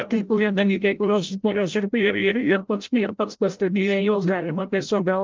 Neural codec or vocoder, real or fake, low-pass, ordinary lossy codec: codec, 16 kHz, 0.5 kbps, FreqCodec, larger model; fake; 7.2 kHz; Opus, 32 kbps